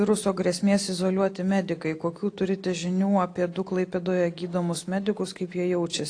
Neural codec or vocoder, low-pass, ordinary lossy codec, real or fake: none; 9.9 kHz; AAC, 48 kbps; real